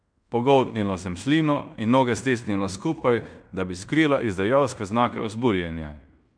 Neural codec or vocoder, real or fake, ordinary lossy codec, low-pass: codec, 16 kHz in and 24 kHz out, 0.9 kbps, LongCat-Audio-Codec, fine tuned four codebook decoder; fake; none; 9.9 kHz